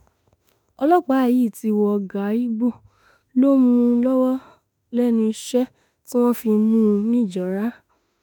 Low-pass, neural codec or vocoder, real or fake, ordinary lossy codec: none; autoencoder, 48 kHz, 32 numbers a frame, DAC-VAE, trained on Japanese speech; fake; none